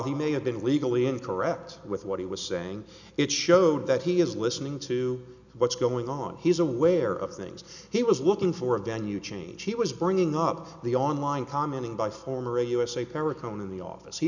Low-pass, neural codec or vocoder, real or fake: 7.2 kHz; none; real